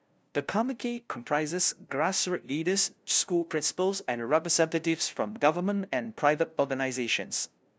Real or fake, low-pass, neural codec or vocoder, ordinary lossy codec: fake; none; codec, 16 kHz, 0.5 kbps, FunCodec, trained on LibriTTS, 25 frames a second; none